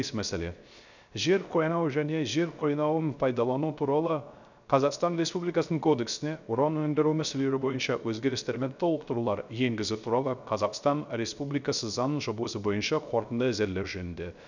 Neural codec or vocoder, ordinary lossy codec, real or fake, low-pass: codec, 16 kHz, 0.3 kbps, FocalCodec; none; fake; 7.2 kHz